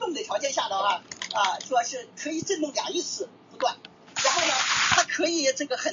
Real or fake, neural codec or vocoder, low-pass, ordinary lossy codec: real; none; 7.2 kHz; AAC, 64 kbps